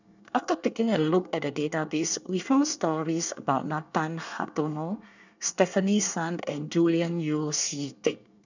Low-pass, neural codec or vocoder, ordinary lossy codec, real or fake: 7.2 kHz; codec, 24 kHz, 1 kbps, SNAC; none; fake